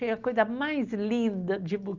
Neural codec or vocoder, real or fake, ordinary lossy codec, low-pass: none; real; Opus, 32 kbps; 7.2 kHz